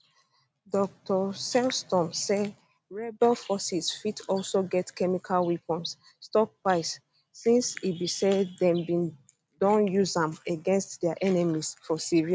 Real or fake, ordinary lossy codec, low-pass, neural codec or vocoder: real; none; none; none